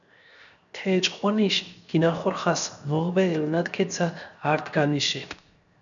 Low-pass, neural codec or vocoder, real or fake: 7.2 kHz; codec, 16 kHz, 0.7 kbps, FocalCodec; fake